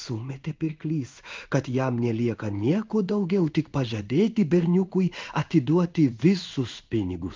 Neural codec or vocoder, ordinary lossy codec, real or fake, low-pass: codec, 16 kHz in and 24 kHz out, 1 kbps, XY-Tokenizer; Opus, 32 kbps; fake; 7.2 kHz